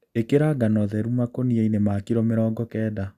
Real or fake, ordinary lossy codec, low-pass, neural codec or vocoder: real; Opus, 64 kbps; 14.4 kHz; none